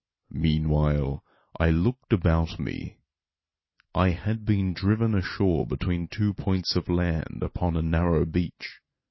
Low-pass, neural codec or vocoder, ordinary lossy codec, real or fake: 7.2 kHz; none; MP3, 24 kbps; real